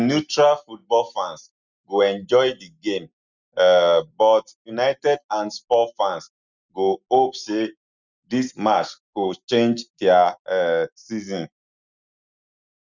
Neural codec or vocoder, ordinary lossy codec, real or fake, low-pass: none; none; real; 7.2 kHz